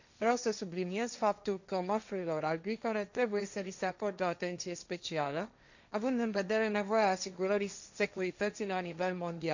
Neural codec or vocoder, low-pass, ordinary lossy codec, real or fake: codec, 16 kHz, 1.1 kbps, Voila-Tokenizer; 7.2 kHz; none; fake